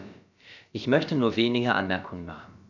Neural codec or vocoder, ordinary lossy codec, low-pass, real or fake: codec, 16 kHz, about 1 kbps, DyCAST, with the encoder's durations; none; 7.2 kHz; fake